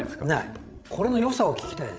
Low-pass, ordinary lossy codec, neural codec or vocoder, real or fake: none; none; codec, 16 kHz, 16 kbps, FreqCodec, larger model; fake